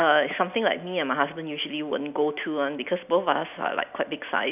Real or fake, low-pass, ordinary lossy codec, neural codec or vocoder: real; 3.6 kHz; none; none